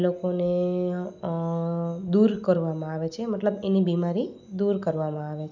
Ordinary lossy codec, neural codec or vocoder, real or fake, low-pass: none; none; real; 7.2 kHz